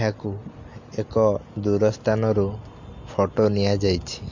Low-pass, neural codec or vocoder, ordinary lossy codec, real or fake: 7.2 kHz; none; MP3, 48 kbps; real